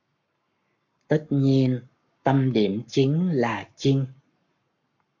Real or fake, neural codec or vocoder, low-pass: fake; codec, 44.1 kHz, 7.8 kbps, Pupu-Codec; 7.2 kHz